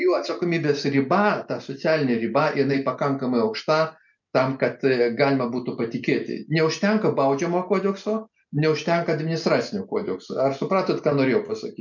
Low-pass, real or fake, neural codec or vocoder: 7.2 kHz; real; none